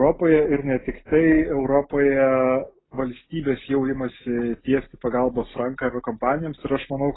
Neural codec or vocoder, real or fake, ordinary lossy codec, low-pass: none; real; AAC, 16 kbps; 7.2 kHz